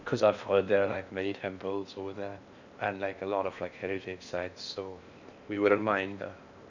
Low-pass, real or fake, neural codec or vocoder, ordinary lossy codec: 7.2 kHz; fake; codec, 16 kHz in and 24 kHz out, 0.8 kbps, FocalCodec, streaming, 65536 codes; none